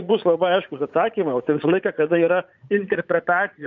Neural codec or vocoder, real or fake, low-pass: vocoder, 44.1 kHz, 80 mel bands, Vocos; fake; 7.2 kHz